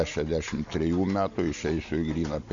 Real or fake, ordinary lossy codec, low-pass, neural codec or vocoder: real; AAC, 64 kbps; 7.2 kHz; none